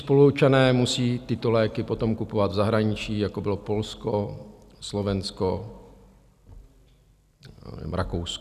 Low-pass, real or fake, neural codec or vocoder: 14.4 kHz; real; none